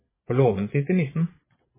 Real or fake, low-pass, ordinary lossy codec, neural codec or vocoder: fake; 3.6 kHz; MP3, 16 kbps; vocoder, 22.05 kHz, 80 mel bands, Vocos